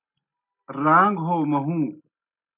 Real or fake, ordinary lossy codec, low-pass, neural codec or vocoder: real; MP3, 32 kbps; 3.6 kHz; none